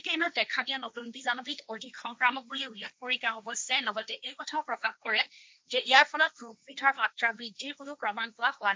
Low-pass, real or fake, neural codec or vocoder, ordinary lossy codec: none; fake; codec, 16 kHz, 1.1 kbps, Voila-Tokenizer; none